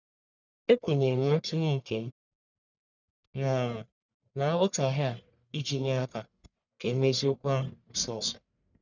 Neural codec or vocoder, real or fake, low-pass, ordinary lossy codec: codec, 44.1 kHz, 1.7 kbps, Pupu-Codec; fake; 7.2 kHz; none